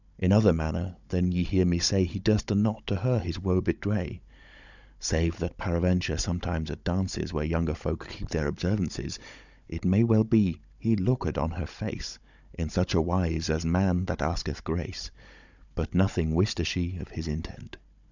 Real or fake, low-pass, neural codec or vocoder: fake; 7.2 kHz; codec, 16 kHz, 16 kbps, FunCodec, trained on Chinese and English, 50 frames a second